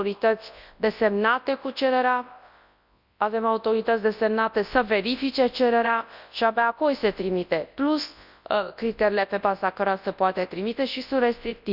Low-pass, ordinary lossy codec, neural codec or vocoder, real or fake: 5.4 kHz; none; codec, 24 kHz, 0.9 kbps, WavTokenizer, large speech release; fake